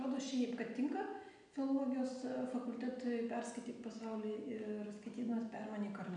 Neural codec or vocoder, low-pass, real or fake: none; 9.9 kHz; real